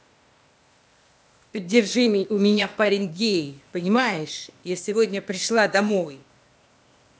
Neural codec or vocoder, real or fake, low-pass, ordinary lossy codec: codec, 16 kHz, 0.8 kbps, ZipCodec; fake; none; none